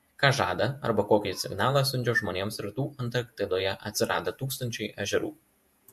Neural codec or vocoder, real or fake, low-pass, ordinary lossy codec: vocoder, 48 kHz, 128 mel bands, Vocos; fake; 14.4 kHz; MP3, 64 kbps